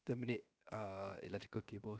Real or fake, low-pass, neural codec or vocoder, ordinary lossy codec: fake; none; codec, 16 kHz, 0.7 kbps, FocalCodec; none